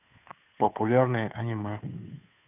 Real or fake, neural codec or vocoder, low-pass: fake; codec, 16 kHz, 2 kbps, FunCodec, trained on Chinese and English, 25 frames a second; 3.6 kHz